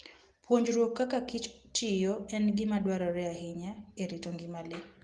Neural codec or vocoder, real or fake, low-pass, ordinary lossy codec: none; real; 10.8 kHz; Opus, 24 kbps